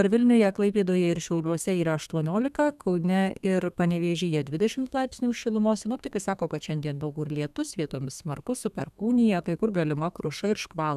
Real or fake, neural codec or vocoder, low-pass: fake; codec, 32 kHz, 1.9 kbps, SNAC; 14.4 kHz